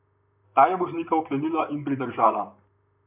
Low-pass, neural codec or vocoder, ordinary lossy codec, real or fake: 3.6 kHz; vocoder, 44.1 kHz, 128 mel bands, Pupu-Vocoder; none; fake